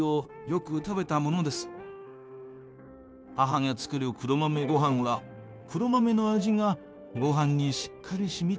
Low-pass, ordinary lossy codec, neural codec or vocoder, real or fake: none; none; codec, 16 kHz, 0.9 kbps, LongCat-Audio-Codec; fake